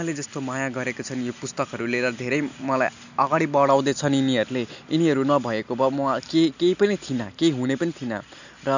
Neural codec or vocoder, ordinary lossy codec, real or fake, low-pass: none; none; real; 7.2 kHz